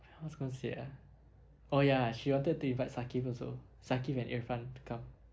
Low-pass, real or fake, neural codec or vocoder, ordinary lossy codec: none; real; none; none